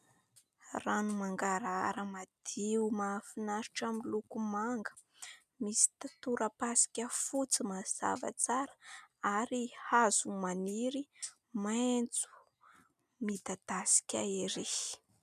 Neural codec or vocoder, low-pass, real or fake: none; 14.4 kHz; real